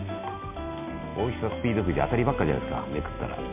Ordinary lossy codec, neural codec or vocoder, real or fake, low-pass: MP3, 24 kbps; none; real; 3.6 kHz